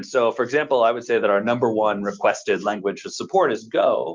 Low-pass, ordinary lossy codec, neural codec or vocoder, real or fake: 7.2 kHz; Opus, 24 kbps; none; real